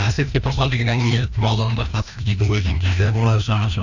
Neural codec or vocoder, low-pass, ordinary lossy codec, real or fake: codec, 16 kHz, 1 kbps, FreqCodec, larger model; 7.2 kHz; none; fake